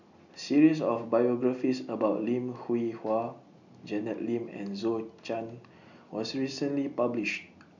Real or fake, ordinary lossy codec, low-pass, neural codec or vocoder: real; none; 7.2 kHz; none